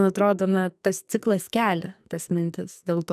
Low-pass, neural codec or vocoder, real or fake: 14.4 kHz; codec, 44.1 kHz, 2.6 kbps, SNAC; fake